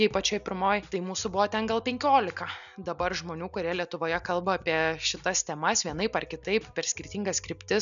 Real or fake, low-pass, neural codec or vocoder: real; 7.2 kHz; none